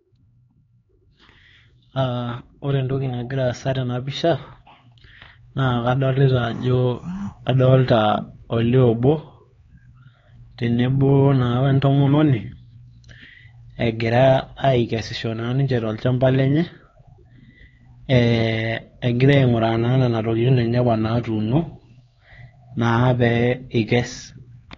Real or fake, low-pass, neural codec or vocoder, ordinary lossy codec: fake; 7.2 kHz; codec, 16 kHz, 4 kbps, X-Codec, HuBERT features, trained on LibriSpeech; AAC, 32 kbps